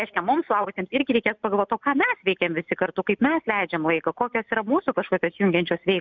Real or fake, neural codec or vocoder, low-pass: real; none; 7.2 kHz